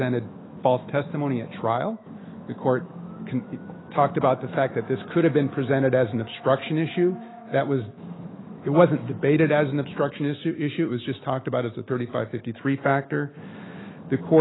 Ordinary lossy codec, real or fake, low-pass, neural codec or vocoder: AAC, 16 kbps; fake; 7.2 kHz; autoencoder, 48 kHz, 128 numbers a frame, DAC-VAE, trained on Japanese speech